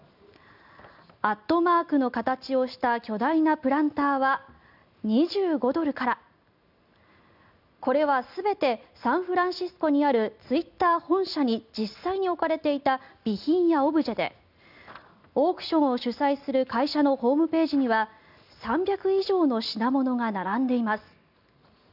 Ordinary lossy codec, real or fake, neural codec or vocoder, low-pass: none; real; none; 5.4 kHz